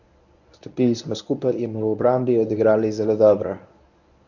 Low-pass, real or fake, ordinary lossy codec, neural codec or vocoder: 7.2 kHz; fake; none; codec, 24 kHz, 0.9 kbps, WavTokenizer, medium speech release version 1